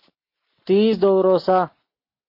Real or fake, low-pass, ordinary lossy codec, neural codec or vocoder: real; 5.4 kHz; MP3, 32 kbps; none